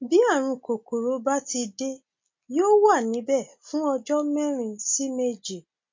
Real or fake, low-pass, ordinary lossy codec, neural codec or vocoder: real; 7.2 kHz; MP3, 48 kbps; none